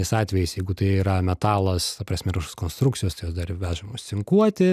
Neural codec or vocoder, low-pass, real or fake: none; 14.4 kHz; real